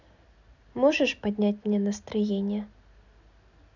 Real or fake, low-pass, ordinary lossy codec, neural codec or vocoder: real; 7.2 kHz; none; none